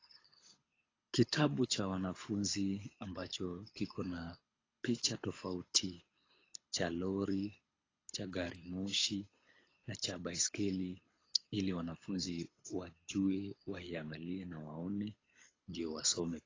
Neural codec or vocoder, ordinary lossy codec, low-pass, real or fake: codec, 24 kHz, 6 kbps, HILCodec; AAC, 32 kbps; 7.2 kHz; fake